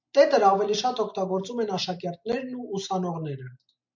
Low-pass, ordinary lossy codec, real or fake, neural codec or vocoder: 7.2 kHz; MP3, 64 kbps; real; none